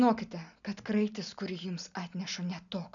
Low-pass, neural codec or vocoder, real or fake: 7.2 kHz; none; real